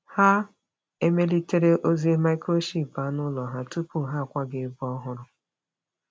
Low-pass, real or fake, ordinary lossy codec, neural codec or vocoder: none; real; none; none